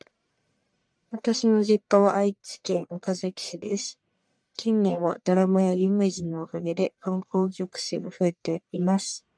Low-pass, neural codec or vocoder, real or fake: 9.9 kHz; codec, 44.1 kHz, 1.7 kbps, Pupu-Codec; fake